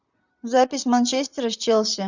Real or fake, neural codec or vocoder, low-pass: fake; vocoder, 44.1 kHz, 128 mel bands, Pupu-Vocoder; 7.2 kHz